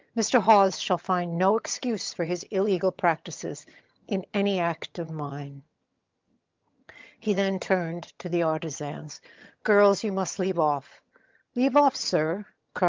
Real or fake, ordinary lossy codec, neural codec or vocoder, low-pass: fake; Opus, 24 kbps; vocoder, 22.05 kHz, 80 mel bands, HiFi-GAN; 7.2 kHz